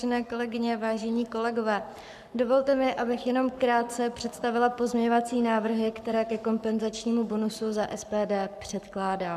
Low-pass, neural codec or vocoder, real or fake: 14.4 kHz; codec, 44.1 kHz, 7.8 kbps, Pupu-Codec; fake